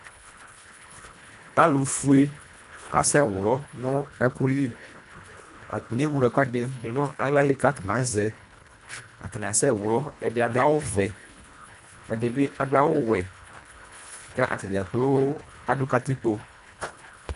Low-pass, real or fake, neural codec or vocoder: 10.8 kHz; fake; codec, 24 kHz, 1.5 kbps, HILCodec